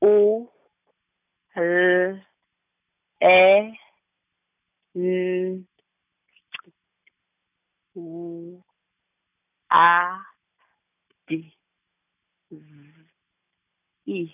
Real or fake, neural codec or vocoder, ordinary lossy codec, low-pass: real; none; none; 3.6 kHz